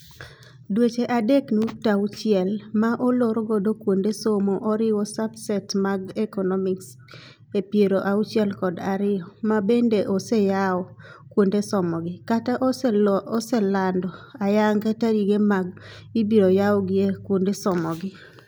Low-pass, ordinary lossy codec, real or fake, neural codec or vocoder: none; none; real; none